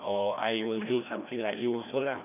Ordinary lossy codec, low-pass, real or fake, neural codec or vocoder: none; 3.6 kHz; fake; codec, 16 kHz, 1 kbps, FreqCodec, larger model